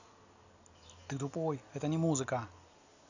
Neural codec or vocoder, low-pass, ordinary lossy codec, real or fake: none; 7.2 kHz; none; real